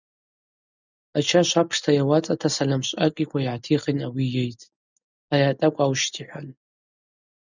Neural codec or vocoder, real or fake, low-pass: none; real; 7.2 kHz